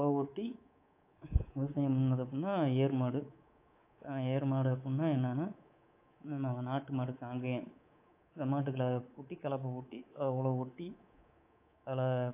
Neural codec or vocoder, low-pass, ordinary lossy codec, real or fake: codec, 24 kHz, 3.1 kbps, DualCodec; 3.6 kHz; AAC, 24 kbps; fake